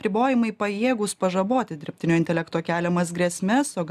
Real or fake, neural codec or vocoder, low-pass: real; none; 14.4 kHz